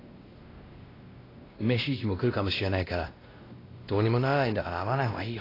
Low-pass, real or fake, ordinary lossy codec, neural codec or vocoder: 5.4 kHz; fake; AAC, 24 kbps; codec, 16 kHz, 1 kbps, X-Codec, WavLM features, trained on Multilingual LibriSpeech